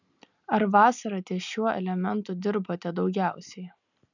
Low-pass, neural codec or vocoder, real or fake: 7.2 kHz; none; real